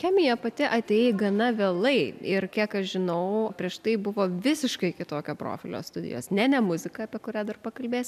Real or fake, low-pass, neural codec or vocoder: real; 14.4 kHz; none